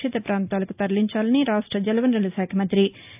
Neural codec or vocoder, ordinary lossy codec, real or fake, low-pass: none; AAC, 32 kbps; real; 3.6 kHz